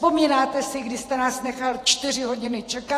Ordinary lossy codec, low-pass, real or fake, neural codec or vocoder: AAC, 48 kbps; 14.4 kHz; fake; vocoder, 48 kHz, 128 mel bands, Vocos